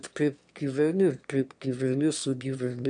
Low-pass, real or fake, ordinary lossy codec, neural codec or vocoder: 9.9 kHz; fake; MP3, 96 kbps; autoencoder, 22.05 kHz, a latent of 192 numbers a frame, VITS, trained on one speaker